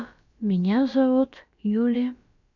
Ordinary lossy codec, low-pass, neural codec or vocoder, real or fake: none; 7.2 kHz; codec, 16 kHz, about 1 kbps, DyCAST, with the encoder's durations; fake